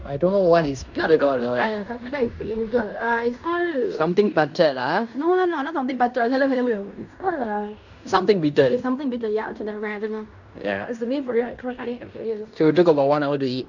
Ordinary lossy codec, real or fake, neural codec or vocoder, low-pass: none; fake; codec, 16 kHz in and 24 kHz out, 0.9 kbps, LongCat-Audio-Codec, fine tuned four codebook decoder; 7.2 kHz